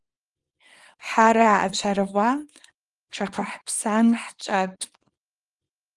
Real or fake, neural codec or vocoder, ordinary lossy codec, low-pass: fake; codec, 24 kHz, 0.9 kbps, WavTokenizer, small release; Opus, 24 kbps; 10.8 kHz